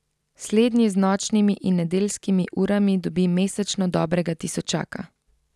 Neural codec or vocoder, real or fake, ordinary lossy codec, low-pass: none; real; none; none